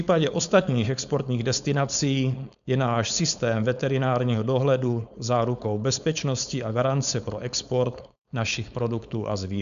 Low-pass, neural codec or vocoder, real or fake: 7.2 kHz; codec, 16 kHz, 4.8 kbps, FACodec; fake